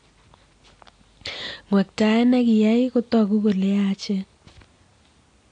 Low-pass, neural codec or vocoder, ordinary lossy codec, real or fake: 9.9 kHz; none; AAC, 48 kbps; real